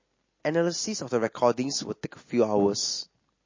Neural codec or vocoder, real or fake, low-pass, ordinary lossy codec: none; real; 7.2 kHz; MP3, 32 kbps